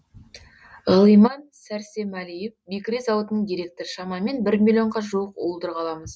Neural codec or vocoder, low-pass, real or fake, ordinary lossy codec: none; none; real; none